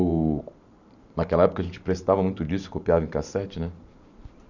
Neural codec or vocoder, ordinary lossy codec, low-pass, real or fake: vocoder, 44.1 kHz, 128 mel bands every 512 samples, BigVGAN v2; none; 7.2 kHz; fake